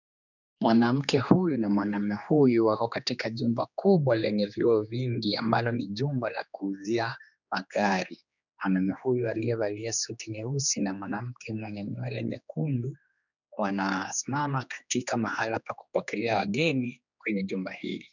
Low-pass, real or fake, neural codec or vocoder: 7.2 kHz; fake; codec, 16 kHz, 2 kbps, X-Codec, HuBERT features, trained on general audio